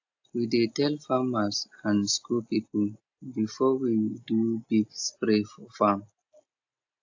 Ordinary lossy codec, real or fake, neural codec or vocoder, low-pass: AAC, 48 kbps; real; none; 7.2 kHz